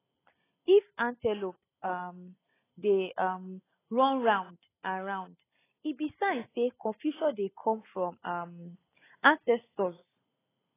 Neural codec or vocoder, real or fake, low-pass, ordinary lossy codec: none; real; 3.6 kHz; AAC, 16 kbps